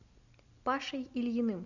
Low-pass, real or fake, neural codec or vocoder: 7.2 kHz; real; none